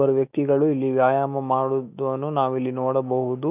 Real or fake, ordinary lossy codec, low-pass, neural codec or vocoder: fake; none; 3.6 kHz; autoencoder, 48 kHz, 128 numbers a frame, DAC-VAE, trained on Japanese speech